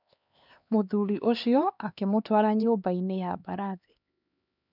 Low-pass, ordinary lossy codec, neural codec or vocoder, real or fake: 5.4 kHz; none; codec, 16 kHz, 2 kbps, X-Codec, HuBERT features, trained on LibriSpeech; fake